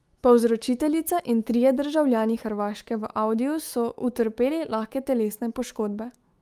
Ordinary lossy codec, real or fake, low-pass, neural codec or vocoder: Opus, 32 kbps; fake; 14.4 kHz; autoencoder, 48 kHz, 128 numbers a frame, DAC-VAE, trained on Japanese speech